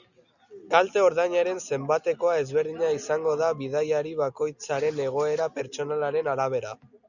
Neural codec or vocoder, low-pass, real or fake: none; 7.2 kHz; real